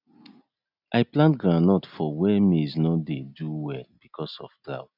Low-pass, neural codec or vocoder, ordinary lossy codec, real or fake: 5.4 kHz; none; none; real